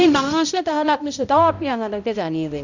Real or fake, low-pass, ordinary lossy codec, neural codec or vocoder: fake; 7.2 kHz; none; codec, 16 kHz, 0.5 kbps, X-Codec, HuBERT features, trained on balanced general audio